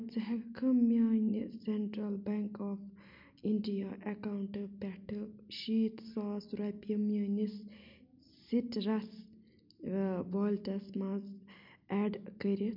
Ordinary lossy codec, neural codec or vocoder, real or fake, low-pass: MP3, 48 kbps; none; real; 5.4 kHz